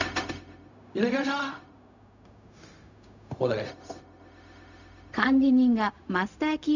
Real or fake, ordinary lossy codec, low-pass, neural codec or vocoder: fake; none; 7.2 kHz; codec, 16 kHz, 0.4 kbps, LongCat-Audio-Codec